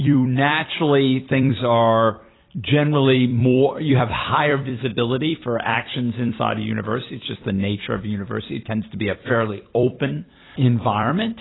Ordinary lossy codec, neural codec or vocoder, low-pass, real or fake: AAC, 16 kbps; vocoder, 44.1 kHz, 128 mel bands every 256 samples, BigVGAN v2; 7.2 kHz; fake